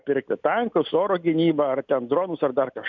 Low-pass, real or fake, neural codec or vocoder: 7.2 kHz; real; none